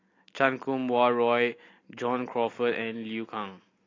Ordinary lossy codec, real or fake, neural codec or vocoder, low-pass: AAC, 32 kbps; real; none; 7.2 kHz